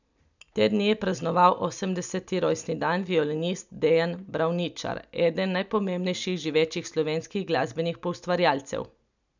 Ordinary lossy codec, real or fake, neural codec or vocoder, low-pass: none; real; none; 7.2 kHz